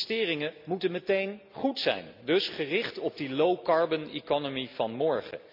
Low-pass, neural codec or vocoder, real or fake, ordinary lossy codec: 5.4 kHz; none; real; MP3, 48 kbps